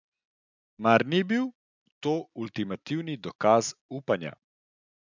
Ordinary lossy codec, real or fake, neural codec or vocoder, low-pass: none; real; none; 7.2 kHz